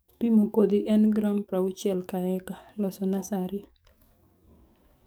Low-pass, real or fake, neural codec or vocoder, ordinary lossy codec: none; fake; codec, 44.1 kHz, 7.8 kbps, DAC; none